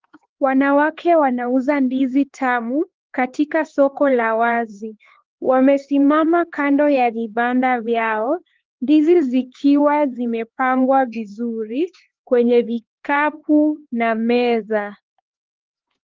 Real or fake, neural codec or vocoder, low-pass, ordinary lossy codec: fake; codec, 16 kHz, 4 kbps, X-Codec, HuBERT features, trained on LibriSpeech; 7.2 kHz; Opus, 16 kbps